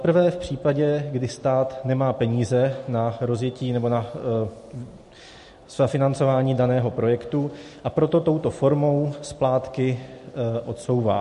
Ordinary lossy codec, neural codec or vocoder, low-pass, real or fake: MP3, 48 kbps; none; 14.4 kHz; real